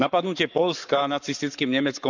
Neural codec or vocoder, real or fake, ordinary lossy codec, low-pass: codec, 44.1 kHz, 7.8 kbps, Pupu-Codec; fake; none; 7.2 kHz